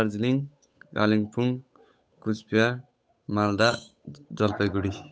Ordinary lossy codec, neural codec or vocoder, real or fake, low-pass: none; codec, 16 kHz, 8 kbps, FunCodec, trained on Chinese and English, 25 frames a second; fake; none